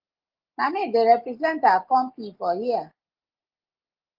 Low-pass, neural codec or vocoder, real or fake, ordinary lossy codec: 5.4 kHz; none; real; Opus, 24 kbps